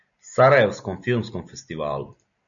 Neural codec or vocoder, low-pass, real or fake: none; 7.2 kHz; real